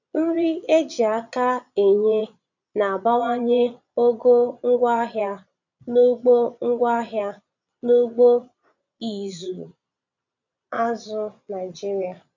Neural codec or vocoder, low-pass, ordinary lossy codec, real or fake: vocoder, 22.05 kHz, 80 mel bands, Vocos; 7.2 kHz; none; fake